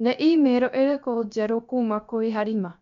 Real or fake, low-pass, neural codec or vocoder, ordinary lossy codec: fake; 7.2 kHz; codec, 16 kHz, 0.3 kbps, FocalCodec; MP3, 96 kbps